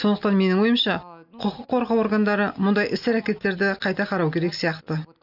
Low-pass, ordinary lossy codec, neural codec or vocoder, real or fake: 5.4 kHz; none; none; real